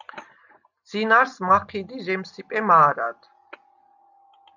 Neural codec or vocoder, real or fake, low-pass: none; real; 7.2 kHz